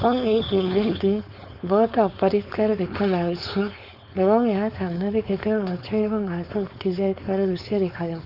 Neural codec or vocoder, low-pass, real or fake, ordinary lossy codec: codec, 16 kHz, 4.8 kbps, FACodec; 5.4 kHz; fake; none